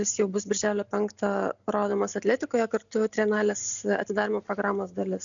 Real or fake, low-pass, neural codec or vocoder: real; 7.2 kHz; none